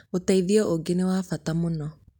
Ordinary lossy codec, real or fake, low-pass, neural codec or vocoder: none; real; 19.8 kHz; none